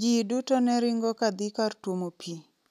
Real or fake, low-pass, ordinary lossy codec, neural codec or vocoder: real; 14.4 kHz; none; none